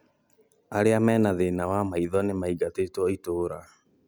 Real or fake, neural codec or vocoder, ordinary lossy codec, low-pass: real; none; none; none